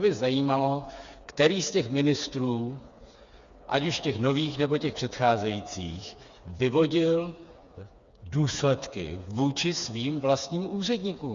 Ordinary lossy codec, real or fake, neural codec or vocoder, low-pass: Opus, 64 kbps; fake; codec, 16 kHz, 4 kbps, FreqCodec, smaller model; 7.2 kHz